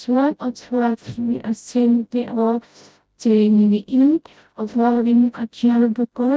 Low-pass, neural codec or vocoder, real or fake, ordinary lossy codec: none; codec, 16 kHz, 0.5 kbps, FreqCodec, smaller model; fake; none